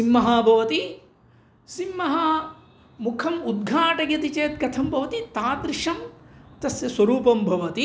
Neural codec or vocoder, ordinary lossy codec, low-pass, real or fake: none; none; none; real